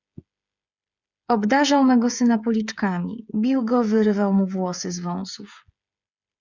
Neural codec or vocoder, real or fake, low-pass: codec, 16 kHz, 8 kbps, FreqCodec, smaller model; fake; 7.2 kHz